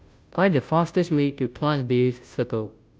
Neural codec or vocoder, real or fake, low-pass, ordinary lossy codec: codec, 16 kHz, 0.5 kbps, FunCodec, trained on Chinese and English, 25 frames a second; fake; none; none